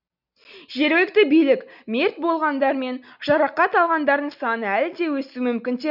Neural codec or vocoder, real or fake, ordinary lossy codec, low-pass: none; real; none; 5.4 kHz